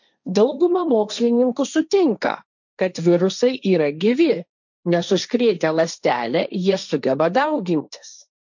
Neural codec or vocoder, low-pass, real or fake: codec, 16 kHz, 1.1 kbps, Voila-Tokenizer; 7.2 kHz; fake